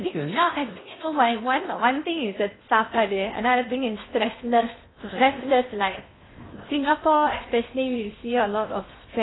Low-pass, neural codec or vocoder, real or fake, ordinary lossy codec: 7.2 kHz; codec, 16 kHz in and 24 kHz out, 0.8 kbps, FocalCodec, streaming, 65536 codes; fake; AAC, 16 kbps